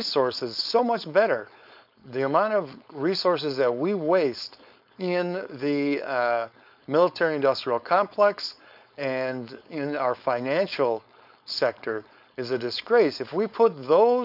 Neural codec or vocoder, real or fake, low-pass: codec, 16 kHz, 4.8 kbps, FACodec; fake; 5.4 kHz